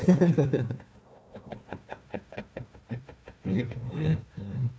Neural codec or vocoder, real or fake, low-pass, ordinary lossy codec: codec, 16 kHz, 1 kbps, FunCodec, trained on Chinese and English, 50 frames a second; fake; none; none